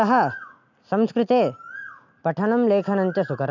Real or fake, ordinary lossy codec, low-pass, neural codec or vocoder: real; none; 7.2 kHz; none